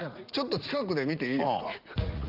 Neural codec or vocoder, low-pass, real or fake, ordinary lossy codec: vocoder, 44.1 kHz, 80 mel bands, Vocos; 5.4 kHz; fake; Opus, 32 kbps